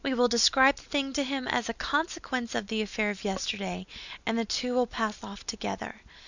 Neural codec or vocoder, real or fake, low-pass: none; real; 7.2 kHz